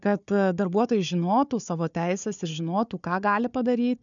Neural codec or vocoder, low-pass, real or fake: codec, 16 kHz, 4 kbps, FunCodec, trained on Chinese and English, 50 frames a second; 7.2 kHz; fake